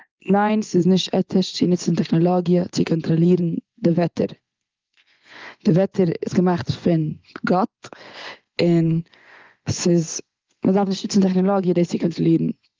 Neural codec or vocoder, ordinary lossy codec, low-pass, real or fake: vocoder, 24 kHz, 100 mel bands, Vocos; Opus, 32 kbps; 7.2 kHz; fake